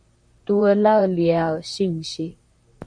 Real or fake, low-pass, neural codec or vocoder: fake; 9.9 kHz; vocoder, 44.1 kHz, 128 mel bands, Pupu-Vocoder